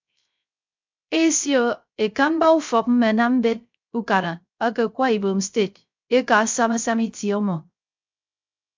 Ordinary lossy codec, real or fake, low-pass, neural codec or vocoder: MP3, 64 kbps; fake; 7.2 kHz; codec, 16 kHz, 0.3 kbps, FocalCodec